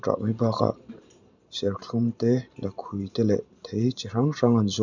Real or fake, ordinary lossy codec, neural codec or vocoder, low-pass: fake; none; vocoder, 44.1 kHz, 128 mel bands every 512 samples, BigVGAN v2; 7.2 kHz